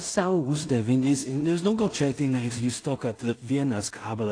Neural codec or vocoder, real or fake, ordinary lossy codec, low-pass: codec, 16 kHz in and 24 kHz out, 0.4 kbps, LongCat-Audio-Codec, two codebook decoder; fake; AAC, 48 kbps; 9.9 kHz